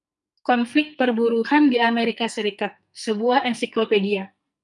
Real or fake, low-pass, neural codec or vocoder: fake; 10.8 kHz; codec, 44.1 kHz, 2.6 kbps, SNAC